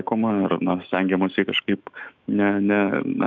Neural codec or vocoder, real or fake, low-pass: autoencoder, 48 kHz, 128 numbers a frame, DAC-VAE, trained on Japanese speech; fake; 7.2 kHz